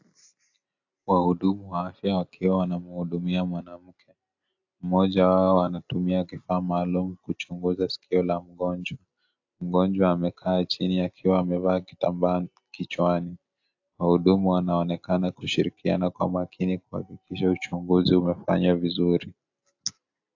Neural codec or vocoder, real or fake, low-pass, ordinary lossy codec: none; real; 7.2 kHz; AAC, 48 kbps